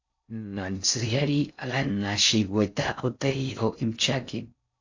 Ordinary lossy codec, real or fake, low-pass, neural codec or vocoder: AAC, 48 kbps; fake; 7.2 kHz; codec, 16 kHz in and 24 kHz out, 0.6 kbps, FocalCodec, streaming, 4096 codes